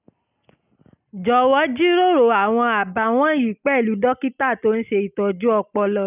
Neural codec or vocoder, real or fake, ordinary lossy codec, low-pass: none; real; none; 3.6 kHz